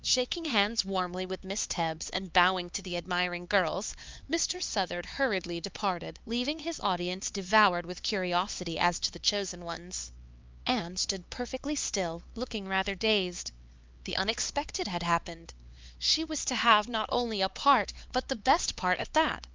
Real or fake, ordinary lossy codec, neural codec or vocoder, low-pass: fake; Opus, 24 kbps; codec, 16 kHz, 4 kbps, X-Codec, HuBERT features, trained on LibriSpeech; 7.2 kHz